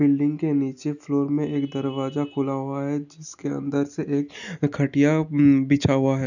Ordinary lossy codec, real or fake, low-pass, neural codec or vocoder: none; real; 7.2 kHz; none